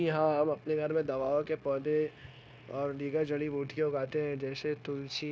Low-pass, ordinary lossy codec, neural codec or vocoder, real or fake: none; none; codec, 16 kHz, 0.9 kbps, LongCat-Audio-Codec; fake